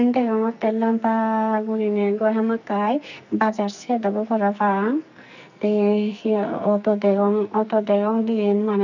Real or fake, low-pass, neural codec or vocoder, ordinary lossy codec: fake; 7.2 kHz; codec, 44.1 kHz, 2.6 kbps, SNAC; none